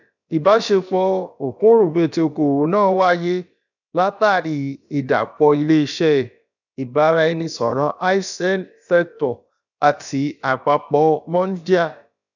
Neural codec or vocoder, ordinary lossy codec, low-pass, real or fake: codec, 16 kHz, about 1 kbps, DyCAST, with the encoder's durations; none; 7.2 kHz; fake